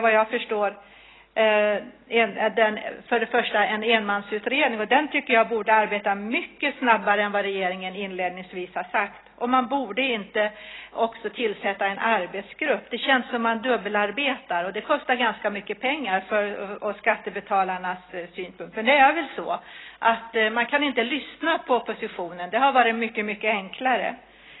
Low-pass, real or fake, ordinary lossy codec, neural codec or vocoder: 7.2 kHz; real; AAC, 16 kbps; none